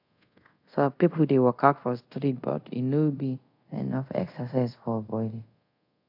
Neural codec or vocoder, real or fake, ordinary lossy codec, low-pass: codec, 24 kHz, 0.5 kbps, DualCodec; fake; AAC, 48 kbps; 5.4 kHz